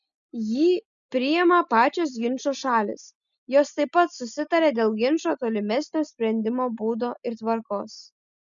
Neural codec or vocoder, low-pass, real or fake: none; 7.2 kHz; real